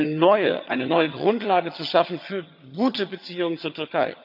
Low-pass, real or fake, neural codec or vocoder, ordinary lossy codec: 5.4 kHz; fake; vocoder, 22.05 kHz, 80 mel bands, HiFi-GAN; none